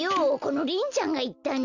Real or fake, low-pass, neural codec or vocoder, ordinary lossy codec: real; 7.2 kHz; none; Opus, 64 kbps